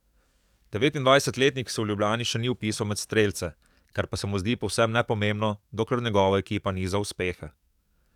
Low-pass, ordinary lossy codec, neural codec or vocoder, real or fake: 19.8 kHz; none; codec, 44.1 kHz, 7.8 kbps, DAC; fake